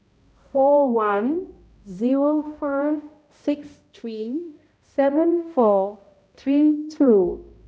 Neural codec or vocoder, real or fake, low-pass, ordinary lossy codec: codec, 16 kHz, 0.5 kbps, X-Codec, HuBERT features, trained on balanced general audio; fake; none; none